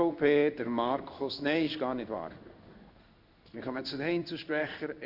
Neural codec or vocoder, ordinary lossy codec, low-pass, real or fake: codec, 16 kHz in and 24 kHz out, 1 kbps, XY-Tokenizer; none; 5.4 kHz; fake